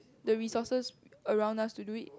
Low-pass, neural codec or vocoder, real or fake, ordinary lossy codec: none; none; real; none